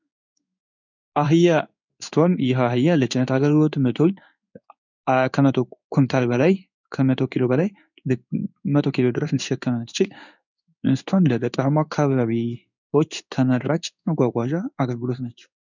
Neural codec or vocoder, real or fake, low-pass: codec, 16 kHz in and 24 kHz out, 1 kbps, XY-Tokenizer; fake; 7.2 kHz